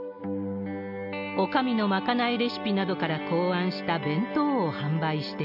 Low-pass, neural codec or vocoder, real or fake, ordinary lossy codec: 5.4 kHz; none; real; none